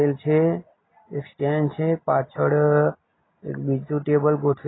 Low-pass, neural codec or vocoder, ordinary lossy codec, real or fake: 7.2 kHz; none; AAC, 16 kbps; real